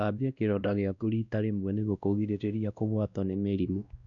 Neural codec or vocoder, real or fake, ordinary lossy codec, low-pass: codec, 16 kHz, 1 kbps, X-Codec, WavLM features, trained on Multilingual LibriSpeech; fake; none; 7.2 kHz